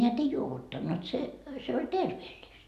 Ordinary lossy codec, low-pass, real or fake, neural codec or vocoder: none; 14.4 kHz; real; none